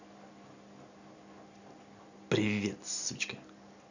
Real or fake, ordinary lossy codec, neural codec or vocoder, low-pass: real; none; none; 7.2 kHz